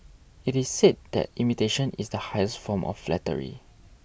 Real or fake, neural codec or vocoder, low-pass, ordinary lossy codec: real; none; none; none